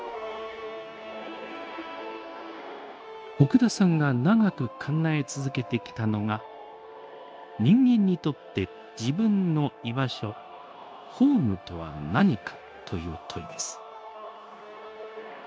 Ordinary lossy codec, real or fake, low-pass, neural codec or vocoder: none; fake; none; codec, 16 kHz, 0.9 kbps, LongCat-Audio-Codec